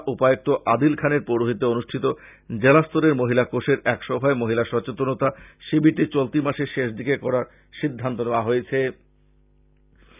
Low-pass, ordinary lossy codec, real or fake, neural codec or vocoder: 3.6 kHz; none; real; none